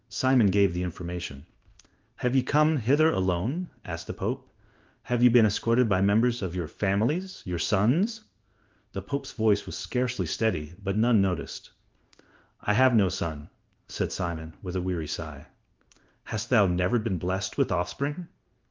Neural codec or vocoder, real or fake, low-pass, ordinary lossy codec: codec, 16 kHz in and 24 kHz out, 1 kbps, XY-Tokenizer; fake; 7.2 kHz; Opus, 24 kbps